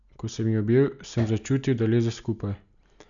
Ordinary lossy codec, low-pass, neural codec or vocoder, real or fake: none; 7.2 kHz; none; real